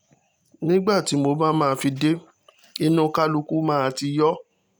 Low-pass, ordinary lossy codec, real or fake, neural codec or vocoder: none; none; real; none